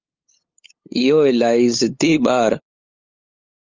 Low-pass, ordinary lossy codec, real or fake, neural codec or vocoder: 7.2 kHz; Opus, 24 kbps; fake; codec, 16 kHz, 8 kbps, FunCodec, trained on LibriTTS, 25 frames a second